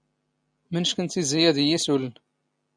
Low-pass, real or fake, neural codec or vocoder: 9.9 kHz; real; none